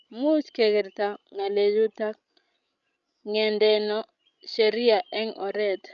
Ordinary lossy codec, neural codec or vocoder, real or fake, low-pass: none; codec, 16 kHz, 16 kbps, FreqCodec, larger model; fake; 7.2 kHz